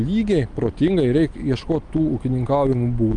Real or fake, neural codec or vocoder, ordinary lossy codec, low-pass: real; none; Opus, 32 kbps; 9.9 kHz